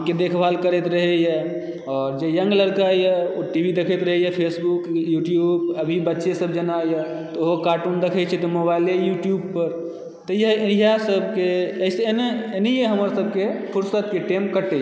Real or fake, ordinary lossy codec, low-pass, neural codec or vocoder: real; none; none; none